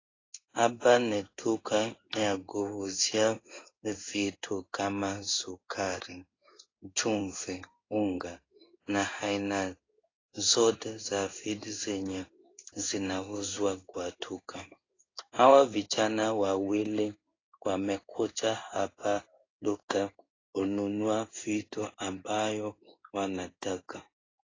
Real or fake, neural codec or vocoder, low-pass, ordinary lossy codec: fake; codec, 16 kHz in and 24 kHz out, 1 kbps, XY-Tokenizer; 7.2 kHz; AAC, 32 kbps